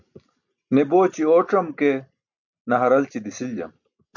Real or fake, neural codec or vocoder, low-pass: real; none; 7.2 kHz